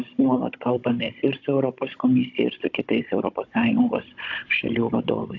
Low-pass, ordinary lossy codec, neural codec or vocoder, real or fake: 7.2 kHz; AAC, 48 kbps; codec, 16 kHz, 16 kbps, FunCodec, trained on Chinese and English, 50 frames a second; fake